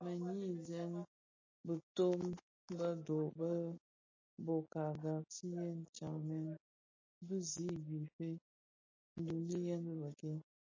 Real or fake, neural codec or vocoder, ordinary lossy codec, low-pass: real; none; MP3, 32 kbps; 7.2 kHz